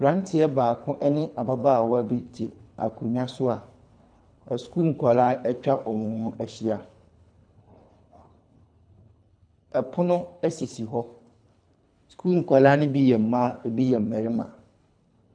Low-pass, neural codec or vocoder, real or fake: 9.9 kHz; codec, 24 kHz, 3 kbps, HILCodec; fake